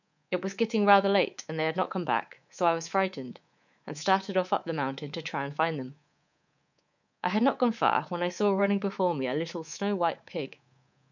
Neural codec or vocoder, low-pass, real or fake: codec, 24 kHz, 3.1 kbps, DualCodec; 7.2 kHz; fake